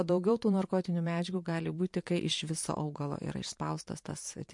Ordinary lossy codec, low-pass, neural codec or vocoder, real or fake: MP3, 48 kbps; 10.8 kHz; vocoder, 44.1 kHz, 128 mel bands every 512 samples, BigVGAN v2; fake